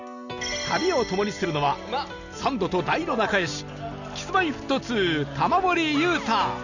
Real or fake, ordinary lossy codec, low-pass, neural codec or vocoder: real; none; 7.2 kHz; none